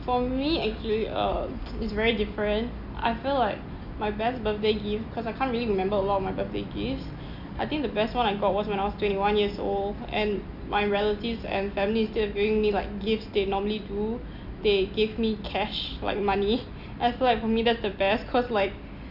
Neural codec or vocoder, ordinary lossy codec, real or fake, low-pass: none; none; real; 5.4 kHz